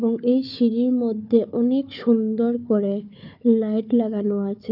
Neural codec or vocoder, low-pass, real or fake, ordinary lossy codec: codec, 16 kHz, 4 kbps, FunCodec, trained on Chinese and English, 50 frames a second; 5.4 kHz; fake; none